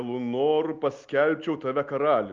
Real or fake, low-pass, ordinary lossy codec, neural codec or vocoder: real; 7.2 kHz; Opus, 24 kbps; none